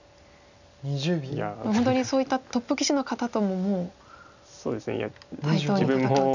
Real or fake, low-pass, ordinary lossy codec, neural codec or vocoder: real; 7.2 kHz; none; none